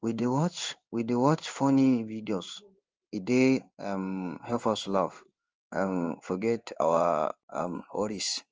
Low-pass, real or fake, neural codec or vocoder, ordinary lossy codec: 7.2 kHz; fake; codec, 16 kHz in and 24 kHz out, 1 kbps, XY-Tokenizer; Opus, 24 kbps